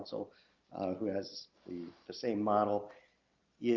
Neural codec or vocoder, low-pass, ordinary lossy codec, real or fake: none; 7.2 kHz; Opus, 32 kbps; real